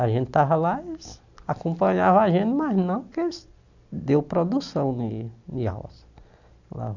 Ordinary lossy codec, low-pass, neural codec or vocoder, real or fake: none; 7.2 kHz; none; real